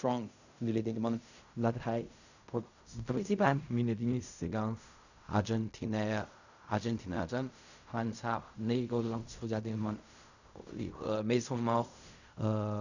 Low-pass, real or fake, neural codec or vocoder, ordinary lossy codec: 7.2 kHz; fake; codec, 16 kHz in and 24 kHz out, 0.4 kbps, LongCat-Audio-Codec, fine tuned four codebook decoder; none